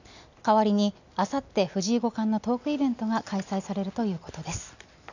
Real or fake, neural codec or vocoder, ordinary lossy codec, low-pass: real; none; none; 7.2 kHz